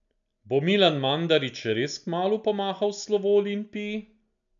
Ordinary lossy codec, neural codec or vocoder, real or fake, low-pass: none; none; real; 7.2 kHz